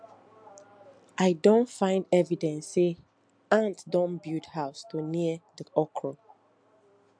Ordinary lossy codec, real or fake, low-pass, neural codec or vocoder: MP3, 64 kbps; real; 9.9 kHz; none